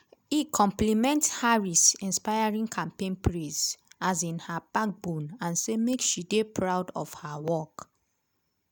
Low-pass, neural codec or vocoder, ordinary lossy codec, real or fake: none; none; none; real